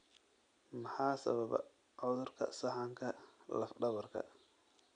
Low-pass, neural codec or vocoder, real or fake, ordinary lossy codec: 9.9 kHz; none; real; none